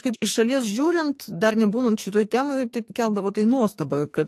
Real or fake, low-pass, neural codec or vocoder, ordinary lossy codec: fake; 14.4 kHz; codec, 44.1 kHz, 2.6 kbps, SNAC; AAC, 64 kbps